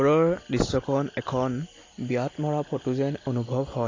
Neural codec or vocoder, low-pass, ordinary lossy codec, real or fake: none; 7.2 kHz; AAC, 32 kbps; real